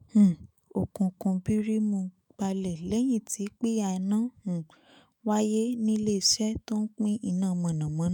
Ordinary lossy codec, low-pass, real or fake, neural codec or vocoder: none; none; fake; autoencoder, 48 kHz, 128 numbers a frame, DAC-VAE, trained on Japanese speech